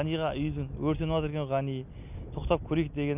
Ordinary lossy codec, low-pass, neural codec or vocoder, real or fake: none; 3.6 kHz; none; real